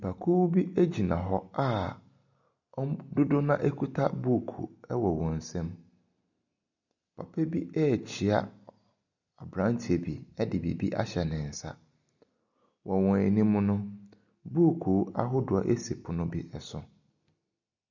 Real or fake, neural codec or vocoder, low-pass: real; none; 7.2 kHz